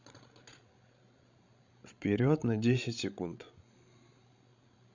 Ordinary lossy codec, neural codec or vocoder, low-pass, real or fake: none; codec, 16 kHz, 16 kbps, FreqCodec, larger model; 7.2 kHz; fake